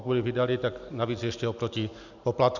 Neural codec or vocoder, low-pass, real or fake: none; 7.2 kHz; real